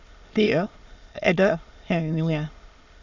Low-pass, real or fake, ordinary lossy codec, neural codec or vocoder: 7.2 kHz; fake; Opus, 64 kbps; autoencoder, 22.05 kHz, a latent of 192 numbers a frame, VITS, trained on many speakers